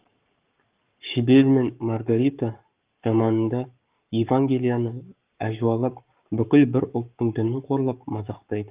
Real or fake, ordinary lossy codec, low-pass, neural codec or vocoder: fake; Opus, 24 kbps; 3.6 kHz; codec, 44.1 kHz, 7.8 kbps, Pupu-Codec